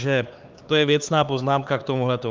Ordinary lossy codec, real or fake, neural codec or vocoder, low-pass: Opus, 32 kbps; fake; codec, 16 kHz, 4 kbps, X-Codec, HuBERT features, trained on LibriSpeech; 7.2 kHz